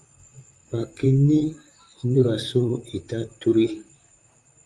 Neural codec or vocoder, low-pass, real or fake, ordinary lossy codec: vocoder, 44.1 kHz, 128 mel bands, Pupu-Vocoder; 10.8 kHz; fake; Opus, 32 kbps